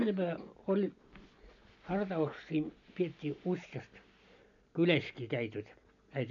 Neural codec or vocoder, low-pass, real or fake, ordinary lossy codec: none; 7.2 kHz; real; none